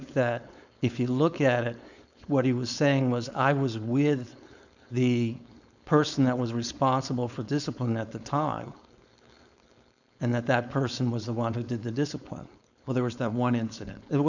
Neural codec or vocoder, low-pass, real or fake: codec, 16 kHz, 4.8 kbps, FACodec; 7.2 kHz; fake